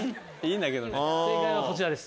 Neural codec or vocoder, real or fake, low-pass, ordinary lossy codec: none; real; none; none